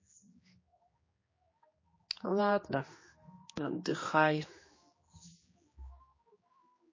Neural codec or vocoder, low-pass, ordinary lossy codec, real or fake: codec, 16 kHz, 2 kbps, X-Codec, HuBERT features, trained on general audio; 7.2 kHz; MP3, 32 kbps; fake